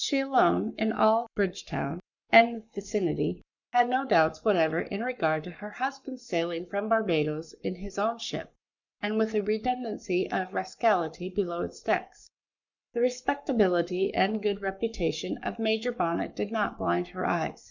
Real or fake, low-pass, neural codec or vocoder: fake; 7.2 kHz; codec, 44.1 kHz, 7.8 kbps, Pupu-Codec